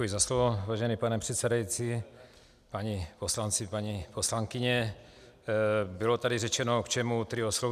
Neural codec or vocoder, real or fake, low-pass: none; real; 14.4 kHz